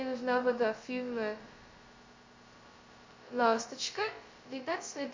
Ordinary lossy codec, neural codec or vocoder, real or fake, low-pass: MP3, 48 kbps; codec, 16 kHz, 0.2 kbps, FocalCodec; fake; 7.2 kHz